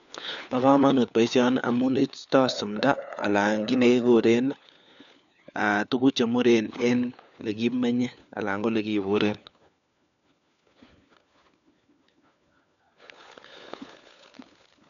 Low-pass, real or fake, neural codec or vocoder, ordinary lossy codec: 7.2 kHz; fake; codec, 16 kHz, 4 kbps, FunCodec, trained on LibriTTS, 50 frames a second; none